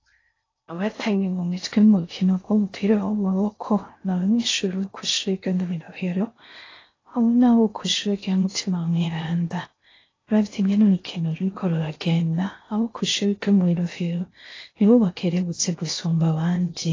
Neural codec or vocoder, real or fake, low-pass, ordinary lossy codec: codec, 16 kHz in and 24 kHz out, 0.6 kbps, FocalCodec, streaming, 4096 codes; fake; 7.2 kHz; AAC, 32 kbps